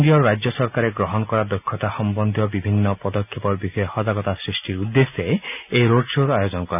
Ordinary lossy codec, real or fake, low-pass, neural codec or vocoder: none; real; 3.6 kHz; none